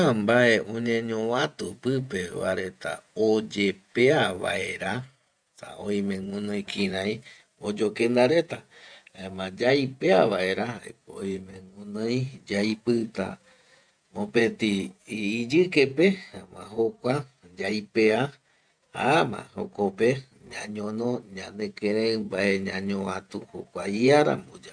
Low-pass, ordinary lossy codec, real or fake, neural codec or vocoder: 9.9 kHz; none; fake; vocoder, 48 kHz, 128 mel bands, Vocos